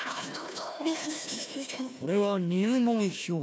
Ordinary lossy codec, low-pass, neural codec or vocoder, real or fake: none; none; codec, 16 kHz, 1 kbps, FunCodec, trained on Chinese and English, 50 frames a second; fake